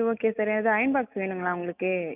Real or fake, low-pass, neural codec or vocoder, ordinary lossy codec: real; 3.6 kHz; none; none